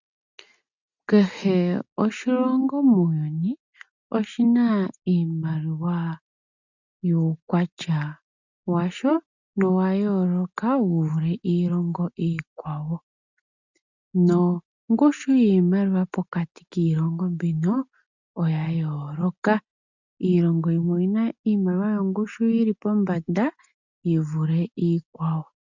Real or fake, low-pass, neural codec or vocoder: real; 7.2 kHz; none